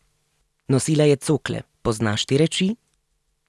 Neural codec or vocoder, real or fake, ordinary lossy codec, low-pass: none; real; none; none